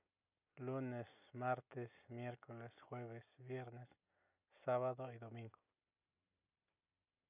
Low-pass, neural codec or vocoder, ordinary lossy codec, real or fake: 3.6 kHz; none; none; real